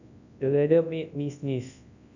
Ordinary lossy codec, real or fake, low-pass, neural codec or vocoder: none; fake; 7.2 kHz; codec, 24 kHz, 0.9 kbps, WavTokenizer, large speech release